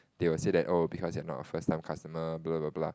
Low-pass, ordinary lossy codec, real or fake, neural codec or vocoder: none; none; real; none